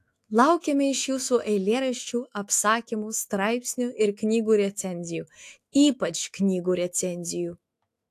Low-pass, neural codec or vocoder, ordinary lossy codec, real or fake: 14.4 kHz; autoencoder, 48 kHz, 128 numbers a frame, DAC-VAE, trained on Japanese speech; AAC, 64 kbps; fake